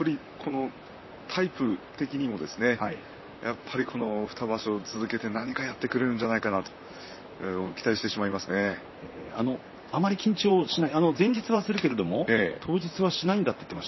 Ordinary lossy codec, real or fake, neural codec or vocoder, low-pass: MP3, 24 kbps; fake; vocoder, 44.1 kHz, 128 mel bands, Pupu-Vocoder; 7.2 kHz